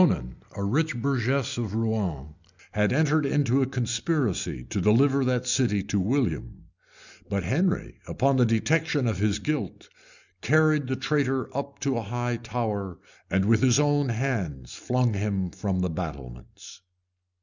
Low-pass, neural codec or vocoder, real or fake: 7.2 kHz; none; real